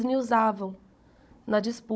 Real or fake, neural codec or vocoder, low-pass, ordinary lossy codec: fake; codec, 16 kHz, 16 kbps, FunCodec, trained on Chinese and English, 50 frames a second; none; none